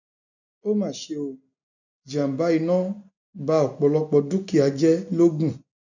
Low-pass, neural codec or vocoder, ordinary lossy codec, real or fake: 7.2 kHz; none; none; real